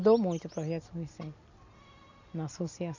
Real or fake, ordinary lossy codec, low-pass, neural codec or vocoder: real; none; 7.2 kHz; none